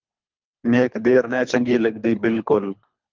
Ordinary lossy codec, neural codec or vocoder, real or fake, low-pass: Opus, 32 kbps; codec, 24 kHz, 3 kbps, HILCodec; fake; 7.2 kHz